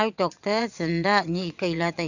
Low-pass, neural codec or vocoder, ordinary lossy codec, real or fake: 7.2 kHz; vocoder, 22.05 kHz, 80 mel bands, WaveNeXt; none; fake